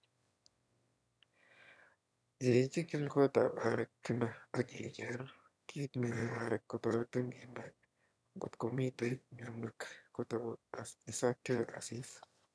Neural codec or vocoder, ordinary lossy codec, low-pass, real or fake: autoencoder, 22.05 kHz, a latent of 192 numbers a frame, VITS, trained on one speaker; none; none; fake